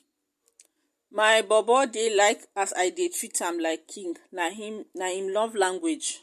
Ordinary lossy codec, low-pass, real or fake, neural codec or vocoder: MP3, 64 kbps; 14.4 kHz; real; none